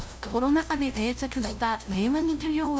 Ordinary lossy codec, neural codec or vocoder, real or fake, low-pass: none; codec, 16 kHz, 0.5 kbps, FunCodec, trained on LibriTTS, 25 frames a second; fake; none